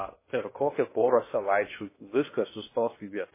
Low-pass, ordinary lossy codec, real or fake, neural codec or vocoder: 3.6 kHz; MP3, 16 kbps; fake; codec, 16 kHz in and 24 kHz out, 0.6 kbps, FocalCodec, streaming, 2048 codes